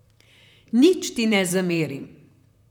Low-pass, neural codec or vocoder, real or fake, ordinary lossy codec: 19.8 kHz; vocoder, 44.1 kHz, 128 mel bands, Pupu-Vocoder; fake; none